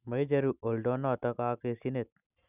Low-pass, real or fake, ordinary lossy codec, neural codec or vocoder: 3.6 kHz; real; none; none